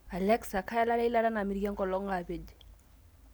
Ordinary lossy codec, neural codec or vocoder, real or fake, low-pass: none; none; real; none